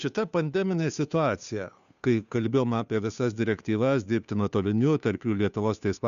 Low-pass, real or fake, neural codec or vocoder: 7.2 kHz; fake; codec, 16 kHz, 2 kbps, FunCodec, trained on Chinese and English, 25 frames a second